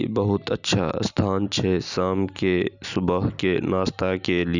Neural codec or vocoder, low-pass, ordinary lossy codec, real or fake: none; 7.2 kHz; none; real